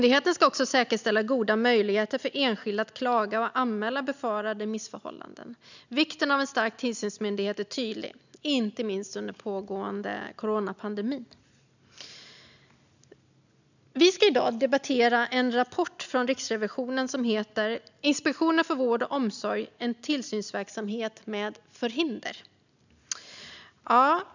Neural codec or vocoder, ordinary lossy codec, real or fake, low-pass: none; none; real; 7.2 kHz